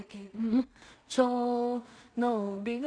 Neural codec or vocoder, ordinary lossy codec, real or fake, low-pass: codec, 16 kHz in and 24 kHz out, 0.4 kbps, LongCat-Audio-Codec, two codebook decoder; none; fake; 9.9 kHz